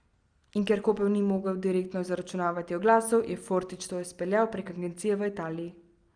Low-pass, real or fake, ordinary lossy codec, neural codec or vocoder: 9.9 kHz; real; Opus, 32 kbps; none